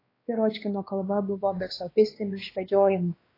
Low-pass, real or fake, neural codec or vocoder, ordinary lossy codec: 5.4 kHz; fake; codec, 16 kHz, 2 kbps, X-Codec, WavLM features, trained on Multilingual LibriSpeech; AAC, 24 kbps